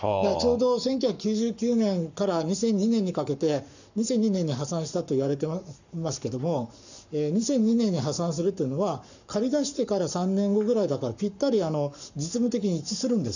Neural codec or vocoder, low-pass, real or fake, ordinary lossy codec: codec, 44.1 kHz, 7.8 kbps, Pupu-Codec; 7.2 kHz; fake; none